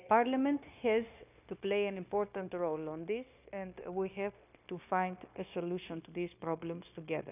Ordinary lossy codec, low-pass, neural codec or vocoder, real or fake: none; 3.6 kHz; codec, 16 kHz, 0.9 kbps, LongCat-Audio-Codec; fake